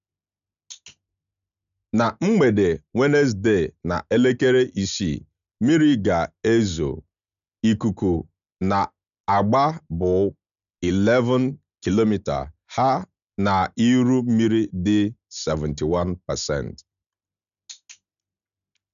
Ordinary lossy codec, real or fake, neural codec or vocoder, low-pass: MP3, 96 kbps; real; none; 7.2 kHz